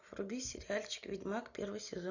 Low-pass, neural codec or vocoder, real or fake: 7.2 kHz; none; real